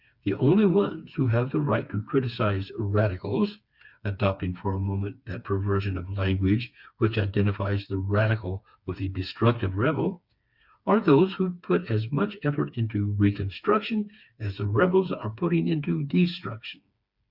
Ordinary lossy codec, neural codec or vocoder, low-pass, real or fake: Opus, 64 kbps; codec, 16 kHz, 4 kbps, FreqCodec, smaller model; 5.4 kHz; fake